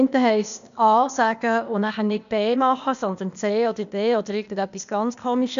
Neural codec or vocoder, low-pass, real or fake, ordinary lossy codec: codec, 16 kHz, 0.8 kbps, ZipCodec; 7.2 kHz; fake; none